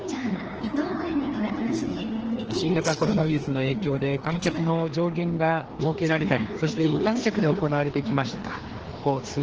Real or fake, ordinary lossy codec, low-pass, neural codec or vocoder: fake; Opus, 16 kbps; 7.2 kHz; codec, 16 kHz, 2 kbps, FreqCodec, larger model